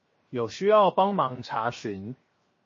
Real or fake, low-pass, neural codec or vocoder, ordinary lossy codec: fake; 7.2 kHz; codec, 16 kHz, 0.7 kbps, FocalCodec; MP3, 32 kbps